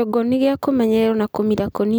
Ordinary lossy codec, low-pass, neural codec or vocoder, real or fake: none; none; none; real